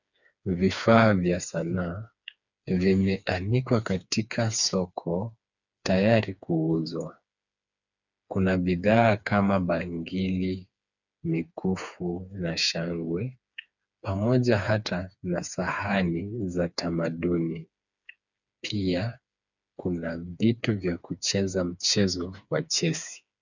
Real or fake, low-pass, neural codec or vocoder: fake; 7.2 kHz; codec, 16 kHz, 4 kbps, FreqCodec, smaller model